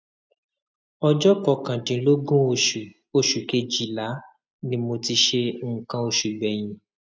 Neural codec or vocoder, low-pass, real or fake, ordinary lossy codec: none; none; real; none